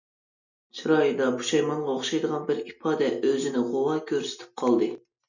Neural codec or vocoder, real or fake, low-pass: none; real; 7.2 kHz